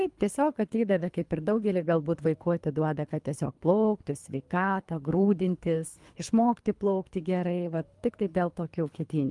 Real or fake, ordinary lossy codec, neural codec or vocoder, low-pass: fake; Opus, 32 kbps; codec, 24 kHz, 3 kbps, HILCodec; 10.8 kHz